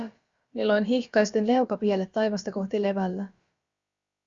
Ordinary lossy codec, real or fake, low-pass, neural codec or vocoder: Opus, 64 kbps; fake; 7.2 kHz; codec, 16 kHz, about 1 kbps, DyCAST, with the encoder's durations